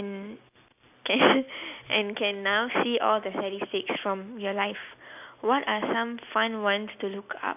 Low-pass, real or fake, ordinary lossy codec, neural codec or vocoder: 3.6 kHz; real; none; none